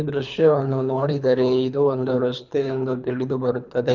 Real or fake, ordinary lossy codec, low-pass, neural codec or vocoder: fake; none; 7.2 kHz; codec, 24 kHz, 3 kbps, HILCodec